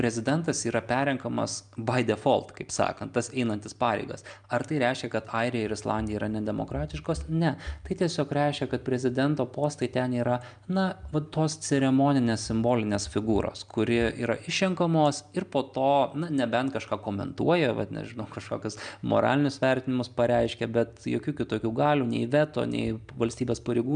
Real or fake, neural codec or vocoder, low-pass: real; none; 9.9 kHz